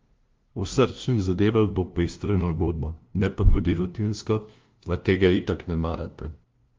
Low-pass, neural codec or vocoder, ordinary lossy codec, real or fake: 7.2 kHz; codec, 16 kHz, 0.5 kbps, FunCodec, trained on LibriTTS, 25 frames a second; Opus, 32 kbps; fake